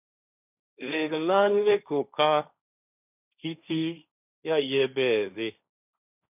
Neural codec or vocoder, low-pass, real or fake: codec, 16 kHz, 1.1 kbps, Voila-Tokenizer; 3.6 kHz; fake